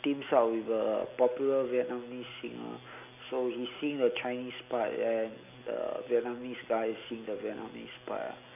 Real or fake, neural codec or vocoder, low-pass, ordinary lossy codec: real; none; 3.6 kHz; none